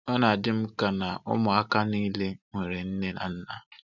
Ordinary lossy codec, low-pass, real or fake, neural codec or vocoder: none; 7.2 kHz; real; none